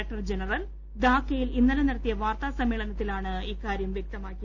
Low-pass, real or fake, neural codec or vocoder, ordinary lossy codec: 7.2 kHz; real; none; none